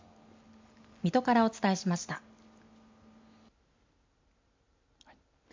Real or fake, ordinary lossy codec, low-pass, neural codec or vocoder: real; MP3, 64 kbps; 7.2 kHz; none